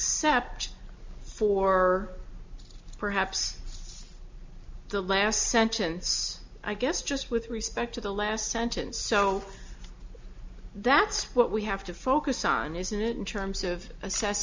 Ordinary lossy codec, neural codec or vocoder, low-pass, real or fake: MP3, 64 kbps; none; 7.2 kHz; real